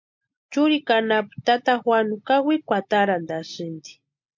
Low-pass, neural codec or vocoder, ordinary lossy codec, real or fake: 7.2 kHz; none; MP3, 48 kbps; real